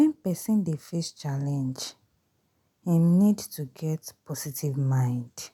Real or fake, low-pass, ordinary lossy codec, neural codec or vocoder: real; 19.8 kHz; none; none